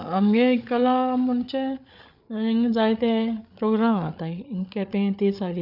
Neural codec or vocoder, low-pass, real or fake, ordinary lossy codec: codec, 16 kHz, 8 kbps, FreqCodec, larger model; 5.4 kHz; fake; none